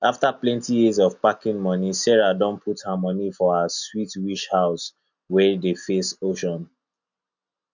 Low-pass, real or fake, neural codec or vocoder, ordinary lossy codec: 7.2 kHz; real; none; none